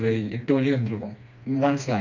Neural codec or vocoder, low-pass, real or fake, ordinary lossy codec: codec, 16 kHz, 2 kbps, FreqCodec, smaller model; 7.2 kHz; fake; none